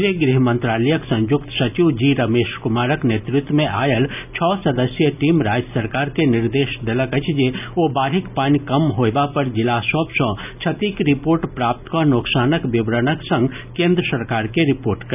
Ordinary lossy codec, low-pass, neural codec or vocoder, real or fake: none; 3.6 kHz; none; real